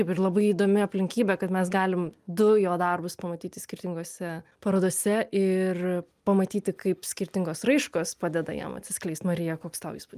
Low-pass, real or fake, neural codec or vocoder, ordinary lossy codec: 14.4 kHz; real; none; Opus, 32 kbps